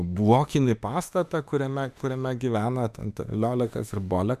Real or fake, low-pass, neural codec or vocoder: fake; 14.4 kHz; autoencoder, 48 kHz, 32 numbers a frame, DAC-VAE, trained on Japanese speech